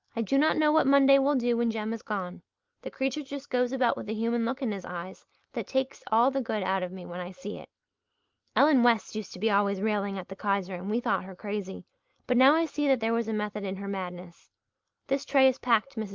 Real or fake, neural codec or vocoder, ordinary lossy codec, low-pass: real; none; Opus, 32 kbps; 7.2 kHz